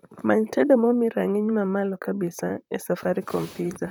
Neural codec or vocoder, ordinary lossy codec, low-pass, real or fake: vocoder, 44.1 kHz, 128 mel bands, Pupu-Vocoder; none; none; fake